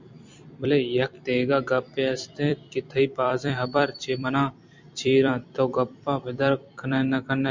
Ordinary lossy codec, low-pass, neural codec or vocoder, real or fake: MP3, 64 kbps; 7.2 kHz; vocoder, 44.1 kHz, 128 mel bands every 256 samples, BigVGAN v2; fake